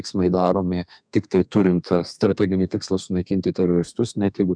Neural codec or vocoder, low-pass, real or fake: codec, 32 kHz, 1.9 kbps, SNAC; 9.9 kHz; fake